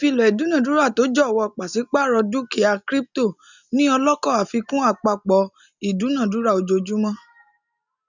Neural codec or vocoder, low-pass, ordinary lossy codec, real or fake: none; 7.2 kHz; none; real